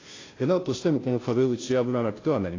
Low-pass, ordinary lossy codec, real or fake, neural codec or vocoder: 7.2 kHz; AAC, 32 kbps; fake; codec, 16 kHz, 0.5 kbps, FunCodec, trained on Chinese and English, 25 frames a second